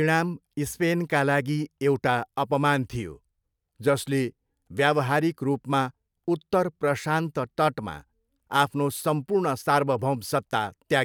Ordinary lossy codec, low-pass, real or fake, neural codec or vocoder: none; none; real; none